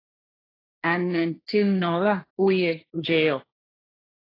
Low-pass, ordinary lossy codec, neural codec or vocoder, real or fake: 5.4 kHz; AAC, 24 kbps; codec, 16 kHz, 1.1 kbps, Voila-Tokenizer; fake